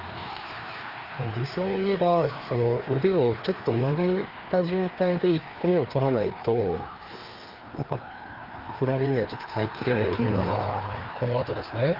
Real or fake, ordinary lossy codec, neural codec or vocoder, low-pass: fake; Opus, 32 kbps; codec, 16 kHz, 2 kbps, FreqCodec, larger model; 5.4 kHz